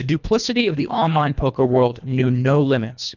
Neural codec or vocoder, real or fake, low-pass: codec, 24 kHz, 1.5 kbps, HILCodec; fake; 7.2 kHz